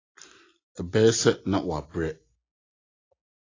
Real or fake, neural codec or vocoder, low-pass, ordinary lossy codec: real; none; 7.2 kHz; AAC, 32 kbps